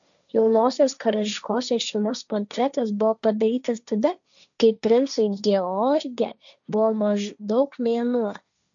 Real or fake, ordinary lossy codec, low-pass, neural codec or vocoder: fake; MP3, 96 kbps; 7.2 kHz; codec, 16 kHz, 1.1 kbps, Voila-Tokenizer